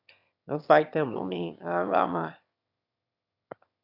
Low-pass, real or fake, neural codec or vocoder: 5.4 kHz; fake; autoencoder, 22.05 kHz, a latent of 192 numbers a frame, VITS, trained on one speaker